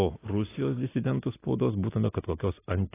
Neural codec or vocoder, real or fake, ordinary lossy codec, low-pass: none; real; AAC, 16 kbps; 3.6 kHz